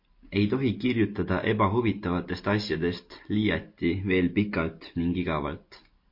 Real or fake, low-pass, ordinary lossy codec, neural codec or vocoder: real; 5.4 kHz; MP3, 32 kbps; none